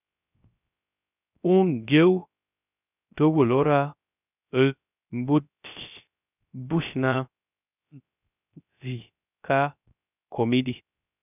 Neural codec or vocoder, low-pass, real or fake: codec, 16 kHz, 0.3 kbps, FocalCodec; 3.6 kHz; fake